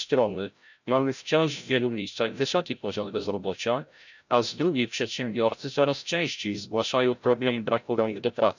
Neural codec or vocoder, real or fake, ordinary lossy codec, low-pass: codec, 16 kHz, 0.5 kbps, FreqCodec, larger model; fake; none; 7.2 kHz